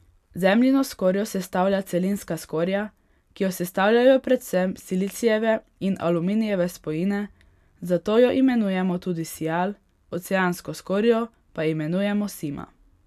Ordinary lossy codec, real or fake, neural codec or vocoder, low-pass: none; real; none; 14.4 kHz